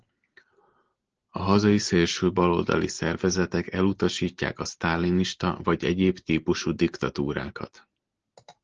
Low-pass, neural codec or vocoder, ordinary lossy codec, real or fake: 7.2 kHz; none; Opus, 16 kbps; real